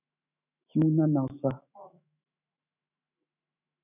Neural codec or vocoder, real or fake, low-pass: autoencoder, 48 kHz, 128 numbers a frame, DAC-VAE, trained on Japanese speech; fake; 3.6 kHz